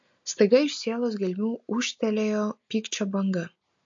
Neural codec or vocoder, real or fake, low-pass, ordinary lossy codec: none; real; 7.2 kHz; MP3, 48 kbps